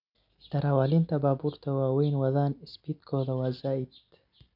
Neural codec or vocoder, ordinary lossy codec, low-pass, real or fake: none; none; 5.4 kHz; real